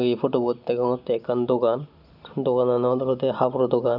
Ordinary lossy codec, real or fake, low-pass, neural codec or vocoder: none; real; 5.4 kHz; none